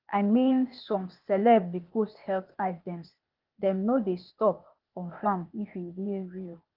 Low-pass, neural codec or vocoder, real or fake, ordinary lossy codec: 5.4 kHz; codec, 16 kHz, 0.8 kbps, ZipCodec; fake; Opus, 32 kbps